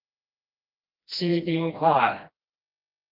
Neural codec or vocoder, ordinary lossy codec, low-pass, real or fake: codec, 16 kHz, 1 kbps, FreqCodec, smaller model; Opus, 24 kbps; 5.4 kHz; fake